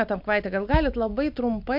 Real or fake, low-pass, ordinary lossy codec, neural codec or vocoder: real; 5.4 kHz; MP3, 48 kbps; none